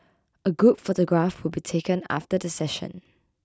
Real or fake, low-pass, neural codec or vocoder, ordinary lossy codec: real; none; none; none